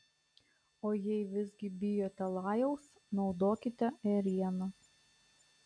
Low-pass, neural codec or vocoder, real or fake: 9.9 kHz; none; real